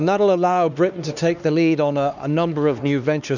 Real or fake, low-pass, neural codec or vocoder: fake; 7.2 kHz; codec, 16 kHz, 2 kbps, X-Codec, HuBERT features, trained on LibriSpeech